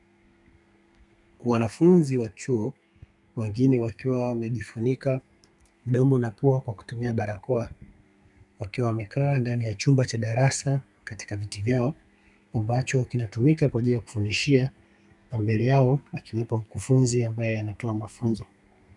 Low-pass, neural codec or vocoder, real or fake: 10.8 kHz; codec, 32 kHz, 1.9 kbps, SNAC; fake